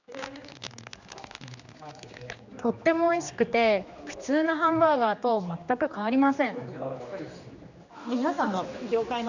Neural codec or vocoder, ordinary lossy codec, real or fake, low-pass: codec, 16 kHz, 2 kbps, X-Codec, HuBERT features, trained on general audio; none; fake; 7.2 kHz